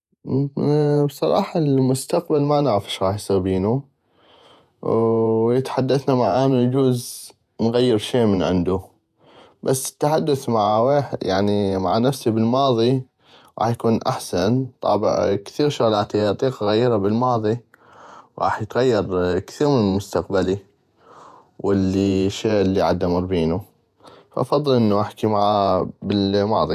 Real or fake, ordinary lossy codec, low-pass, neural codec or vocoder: fake; none; 14.4 kHz; vocoder, 48 kHz, 128 mel bands, Vocos